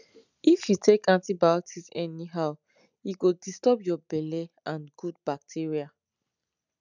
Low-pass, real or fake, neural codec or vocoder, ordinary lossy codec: 7.2 kHz; real; none; none